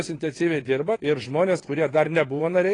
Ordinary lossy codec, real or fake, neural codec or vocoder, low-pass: AAC, 32 kbps; fake; vocoder, 22.05 kHz, 80 mel bands, WaveNeXt; 9.9 kHz